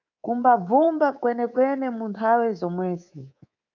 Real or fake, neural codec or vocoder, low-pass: fake; codec, 24 kHz, 3.1 kbps, DualCodec; 7.2 kHz